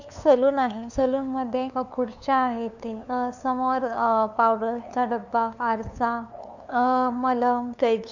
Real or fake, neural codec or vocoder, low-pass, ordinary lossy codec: fake; codec, 16 kHz, 2 kbps, FunCodec, trained on LibriTTS, 25 frames a second; 7.2 kHz; none